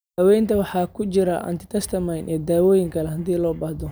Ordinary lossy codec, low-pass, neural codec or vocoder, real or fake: none; none; none; real